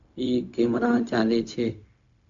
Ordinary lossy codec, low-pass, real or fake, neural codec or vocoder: MP3, 64 kbps; 7.2 kHz; fake; codec, 16 kHz, 0.4 kbps, LongCat-Audio-Codec